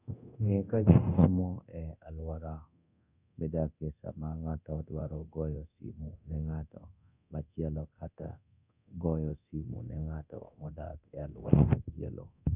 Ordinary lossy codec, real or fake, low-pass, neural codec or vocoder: none; fake; 3.6 kHz; codec, 24 kHz, 0.9 kbps, DualCodec